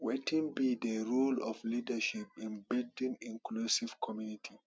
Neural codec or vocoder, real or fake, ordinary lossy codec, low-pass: none; real; none; none